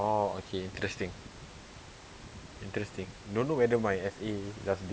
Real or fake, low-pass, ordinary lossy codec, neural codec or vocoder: real; none; none; none